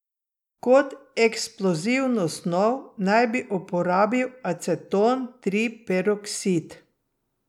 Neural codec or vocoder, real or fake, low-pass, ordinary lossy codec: none; real; 19.8 kHz; none